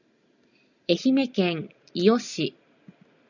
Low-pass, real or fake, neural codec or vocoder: 7.2 kHz; real; none